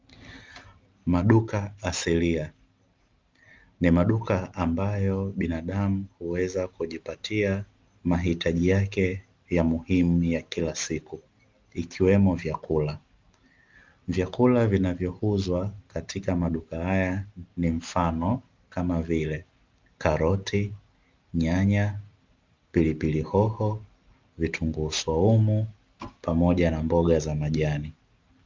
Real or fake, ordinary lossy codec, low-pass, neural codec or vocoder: real; Opus, 32 kbps; 7.2 kHz; none